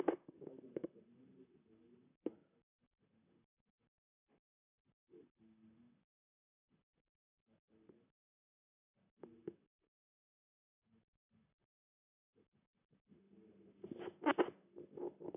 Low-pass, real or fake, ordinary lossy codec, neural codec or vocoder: 3.6 kHz; fake; none; vocoder, 44.1 kHz, 128 mel bands, Pupu-Vocoder